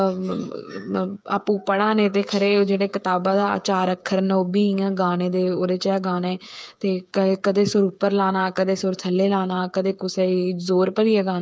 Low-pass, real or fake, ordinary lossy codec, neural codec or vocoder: none; fake; none; codec, 16 kHz, 8 kbps, FreqCodec, smaller model